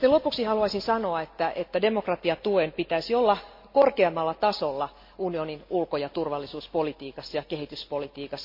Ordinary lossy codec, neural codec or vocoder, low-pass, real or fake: MP3, 32 kbps; none; 5.4 kHz; real